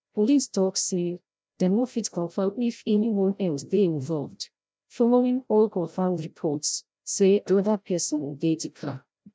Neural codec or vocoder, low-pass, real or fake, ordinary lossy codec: codec, 16 kHz, 0.5 kbps, FreqCodec, larger model; none; fake; none